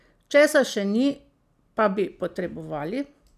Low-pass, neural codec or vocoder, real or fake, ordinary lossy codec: 14.4 kHz; none; real; none